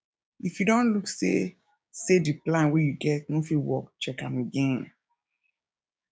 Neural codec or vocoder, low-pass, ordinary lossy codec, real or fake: codec, 16 kHz, 6 kbps, DAC; none; none; fake